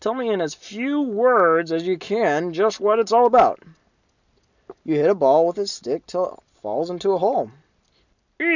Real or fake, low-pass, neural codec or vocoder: real; 7.2 kHz; none